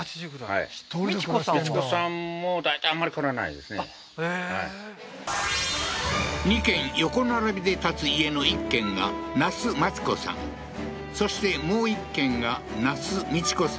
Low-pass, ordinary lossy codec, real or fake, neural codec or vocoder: none; none; real; none